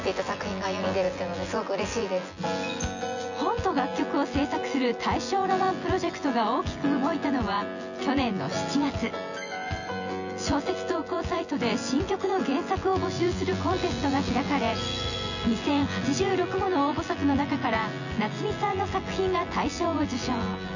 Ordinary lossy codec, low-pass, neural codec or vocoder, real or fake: none; 7.2 kHz; vocoder, 24 kHz, 100 mel bands, Vocos; fake